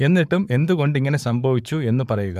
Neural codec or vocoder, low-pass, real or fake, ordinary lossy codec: codec, 44.1 kHz, 7.8 kbps, Pupu-Codec; 14.4 kHz; fake; none